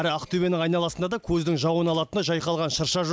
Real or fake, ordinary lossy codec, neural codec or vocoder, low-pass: real; none; none; none